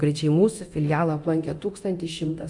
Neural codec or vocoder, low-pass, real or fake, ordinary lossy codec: codec, 24 kHz, 0.9 kbps, DualCodec; 10.8 kHz; fake; Opus, 64 kbps